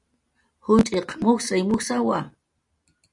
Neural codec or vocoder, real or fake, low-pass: none; real; 10.8 kHz